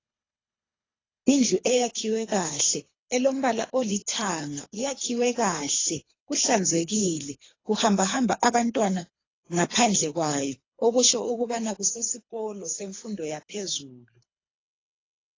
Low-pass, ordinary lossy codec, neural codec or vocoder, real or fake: 7.2 kHz; AAC, 32 kbps; codec, 24 kHz, 3 kbps, HILCodec; fake